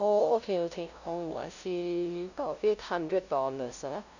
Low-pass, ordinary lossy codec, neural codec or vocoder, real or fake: 7.2 kHz; none; codec, 16 kHz, 0.5 kbps, FunCodec, trained on LibriTTS, 25 frames a second; fake